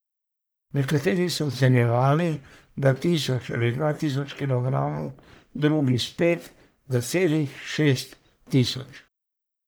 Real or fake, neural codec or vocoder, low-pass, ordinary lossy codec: fake; codec, 44.1 kHz, 1.7 kbps, Pupu-Codec; none; none